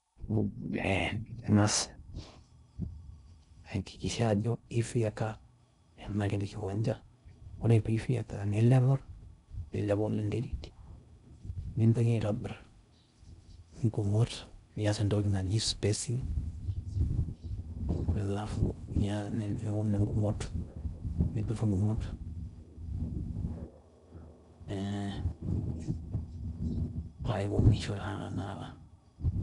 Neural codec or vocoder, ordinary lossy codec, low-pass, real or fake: codec, 16 kHz in and 24 kHz out, 0.6 kbps, FocalCodec, streaming, 2048 codes; none; 10.8 kHz; fake